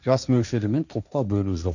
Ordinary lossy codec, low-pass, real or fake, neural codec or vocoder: none; 7.2 kHz; fake; codec, 16 kHz, 1.1 kbps, Voila-Tokenizer